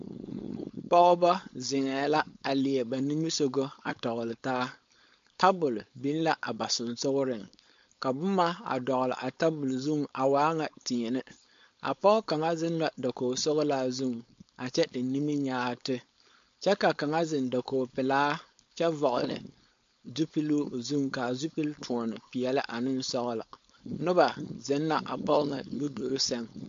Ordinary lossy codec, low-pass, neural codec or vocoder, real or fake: MP3, 48 kbps; 7.2 kHz; codec, 16 kHz, 4.8 kbps, FACodec; fake